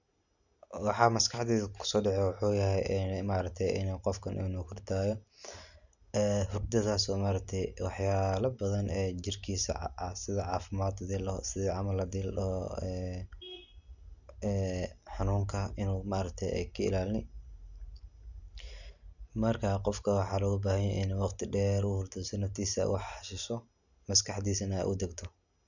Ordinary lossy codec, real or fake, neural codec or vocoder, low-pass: none; real; none; 7.2 kHz